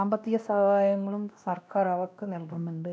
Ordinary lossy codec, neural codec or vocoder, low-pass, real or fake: none; codec, 16 kHz, 1 kbps, X-Codec, WavLM features, trained on Multilingual LibriSpeech; none; fake